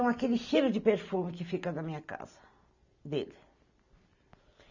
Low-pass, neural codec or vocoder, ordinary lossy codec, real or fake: 7.2 kHz; none; none; real